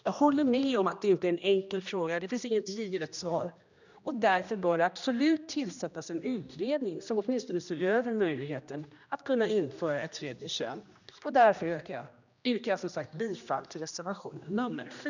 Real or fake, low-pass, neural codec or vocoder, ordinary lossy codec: fake; 7.2 kHz; codec, 16 kHz, 1 kbps, X-Codec, HuBERT features, trained on general audio; none